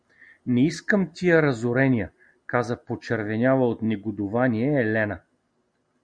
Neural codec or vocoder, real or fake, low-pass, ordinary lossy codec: none; real; 9.9 kHz; Opus, 64 kbps